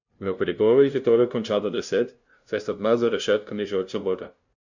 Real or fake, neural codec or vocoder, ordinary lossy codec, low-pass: fake; codec, 16 kHz, 0.5 kbps, FunCodec, trained on LibriTTS, 25 frames a second; none; 7.2 kHz